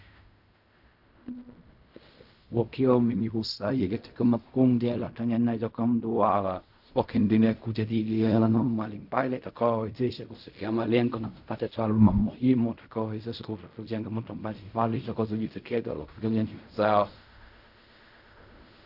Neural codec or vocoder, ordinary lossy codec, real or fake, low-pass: codec, 16 kHz in and 24 kHz out, 0.4 kbps, LongCat-Audio-Codec, fine tuned four codebook decoder; AAC, 48 kbps; fake; 5.4 kHz